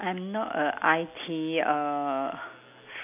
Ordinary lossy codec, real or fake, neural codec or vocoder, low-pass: none; real; none; 3.6 kHz